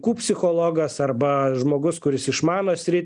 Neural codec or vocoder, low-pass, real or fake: none; 9.9 kHz; real